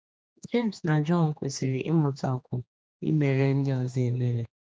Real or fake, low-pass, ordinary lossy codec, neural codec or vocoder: fake; none; none; codec, 16 kHz, 2 kbps, X-Codec, HuBERT features, trained on general audio